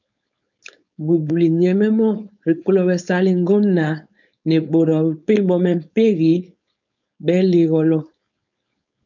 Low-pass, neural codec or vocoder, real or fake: 7.2 kHz; codec, 16 kHz, 4.8 kbps, FACodec; fake